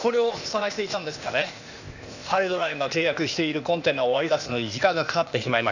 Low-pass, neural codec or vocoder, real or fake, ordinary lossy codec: 7.2 kHz; codec, 16 kHz, 0.8 kbps, ZipCodec; fake; none